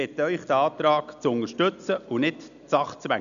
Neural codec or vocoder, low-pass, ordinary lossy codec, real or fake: none; 7.2 kHz; none; real